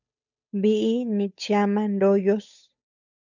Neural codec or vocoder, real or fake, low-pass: codec, 16 kHz, 8 kbps, FunCodec, trained on Chinese and English, 25 frames a second; fake; 7.2 kHz